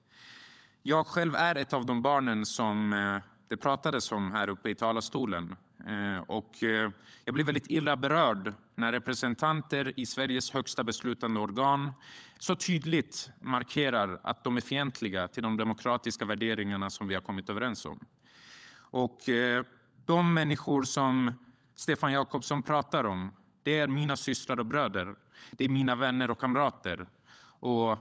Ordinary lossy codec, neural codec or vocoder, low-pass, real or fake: none; codec, 16 kHz, 16 kbps, FunCodec, trained on LibriTTS, 50 frames a second; none; fake